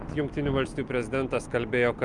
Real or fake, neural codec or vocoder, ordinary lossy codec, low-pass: real; none; Opus, 32 kbps; 10.8 kHz